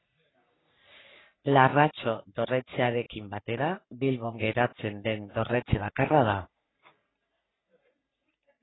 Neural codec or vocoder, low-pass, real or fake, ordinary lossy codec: codec, 44.1 kHz, 3.4 kbps, Pupu-Codec; 7.2 kHz; fake; AAC, 16 kbps